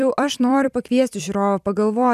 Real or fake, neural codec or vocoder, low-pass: fake; vocoder, 44.1 kHz, 128 mel bands every 256 samples, BigVGAN v2; 14.4 kHz